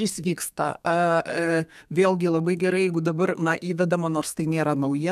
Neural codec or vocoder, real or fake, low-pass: codec, 32 kHz, 1.9 kbps, SNAC; fake; 14.4 kHz